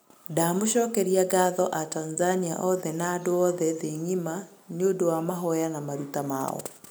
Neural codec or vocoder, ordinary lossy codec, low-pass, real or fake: none; none; none; real